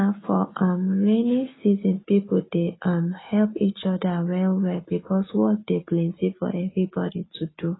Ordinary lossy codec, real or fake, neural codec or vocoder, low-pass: AAC, 16 kbps; real; none; 7.2 kHz